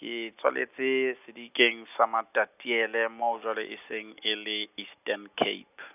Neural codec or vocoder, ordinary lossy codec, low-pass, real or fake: none; none; 3.6 kHz; real